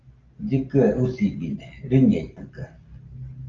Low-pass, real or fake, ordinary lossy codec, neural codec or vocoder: 7.2 kHz; real; Opus, 24 kbps; none